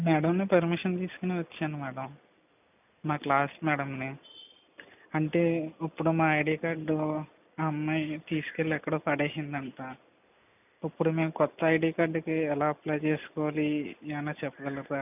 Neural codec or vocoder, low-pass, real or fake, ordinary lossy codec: none; 3.6 kHz; real; none